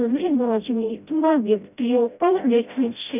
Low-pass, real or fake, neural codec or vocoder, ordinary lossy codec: 3.6 kHz; fake; codec, 16 kHz, 0.5 kbps, FreqCodec, smaller model; none